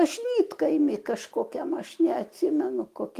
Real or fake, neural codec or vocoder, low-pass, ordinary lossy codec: fake; autoencoder, 48 kHz, 128 numbers a frame, DAC-VAE, trained on Japanese speech; 14.4 kHz; Opus, 24 kbps